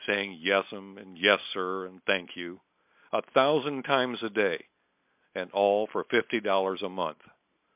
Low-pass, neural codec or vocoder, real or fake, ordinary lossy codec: 3.6 kHz; none; real; MP3, 32 kbps